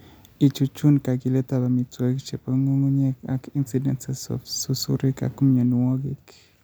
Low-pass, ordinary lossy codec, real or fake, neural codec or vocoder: none; none; real; none